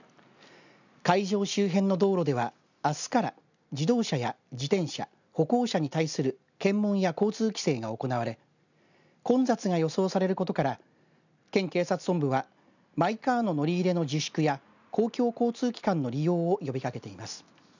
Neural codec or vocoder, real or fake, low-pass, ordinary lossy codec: none; real; 7.2 kHz; none